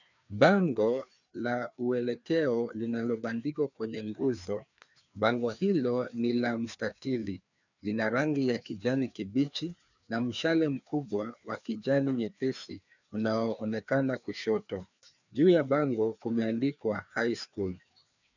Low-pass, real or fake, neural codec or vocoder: 7.2 kHz; fake; codec, 16 kHz, 2 kbps, FreqCodec, larger model